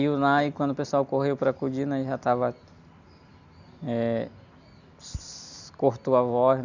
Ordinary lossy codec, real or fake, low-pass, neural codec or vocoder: none; real; 7.2 kHz; none